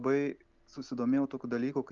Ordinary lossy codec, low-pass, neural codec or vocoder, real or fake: Opus, 32 kbps; 7.2 kHz; none; real